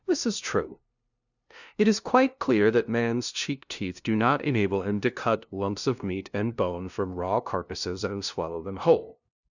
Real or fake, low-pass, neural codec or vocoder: fake; 7.2 kHz; codec, 16 kHz, 0.5 kbps, FunCodec, trained on LibriTTS, 25 frames a second